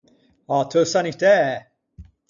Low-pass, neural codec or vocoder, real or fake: 7.2 kHz; none; real